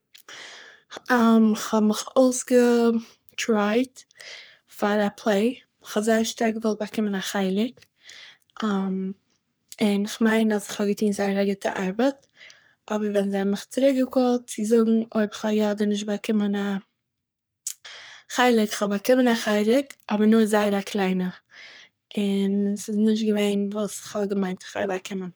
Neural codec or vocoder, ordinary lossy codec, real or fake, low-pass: codec, 44.1 kHz, 3.4 kbps, Pupu-Codec; none; fake; none